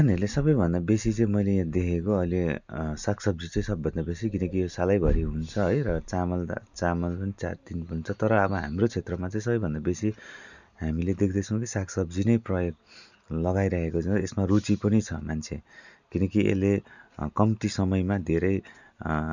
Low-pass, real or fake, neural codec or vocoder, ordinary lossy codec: 7.2 kHz; real; none; none